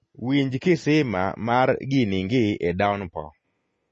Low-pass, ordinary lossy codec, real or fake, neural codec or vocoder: 10.8 kHz; MP3, 32 kbps; real; none